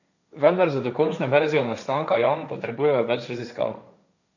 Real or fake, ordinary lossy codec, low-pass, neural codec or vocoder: fake; none; 7.2 kHz; codec, 16 kHz, 1.1 kbps, Voila-Tokenizer